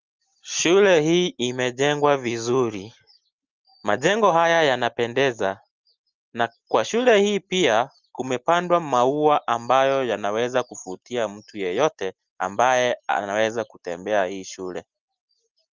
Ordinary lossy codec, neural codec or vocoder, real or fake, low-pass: Opus, 32 kbps; none; real; 7.2 kHz